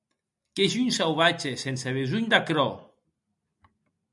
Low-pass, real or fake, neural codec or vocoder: 10.8 kHz; real; none